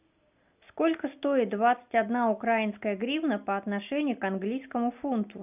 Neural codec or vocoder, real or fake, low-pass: none; real; 3.6 kHz